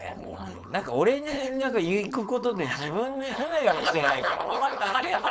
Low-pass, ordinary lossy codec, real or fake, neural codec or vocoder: none; none; fake; codec, 16 kHz, 4.8 kbps, FACodec